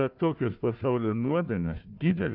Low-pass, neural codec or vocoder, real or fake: 5.4 kHz; codec, 16 kHz, 1 kbps, FunCodec, trained on Chinese and English, 50 frames a second; fake